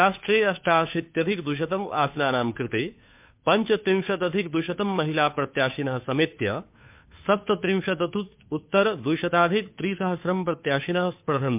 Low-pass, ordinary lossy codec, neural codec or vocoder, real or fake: 3.6 kHz; MP3, 32 kbps; codec, 16 kHz, 2 kbps, FunCodec, trained on Chinese and English, 25 frames a second; fake